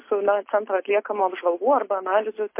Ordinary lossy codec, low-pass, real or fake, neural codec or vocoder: MP3, 24 kbps; 3.6 kHz; real; none